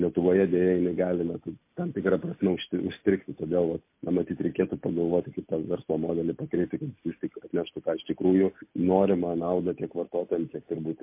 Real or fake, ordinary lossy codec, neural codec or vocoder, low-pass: real; MP3, 24 kbps; none; 3.6 kHz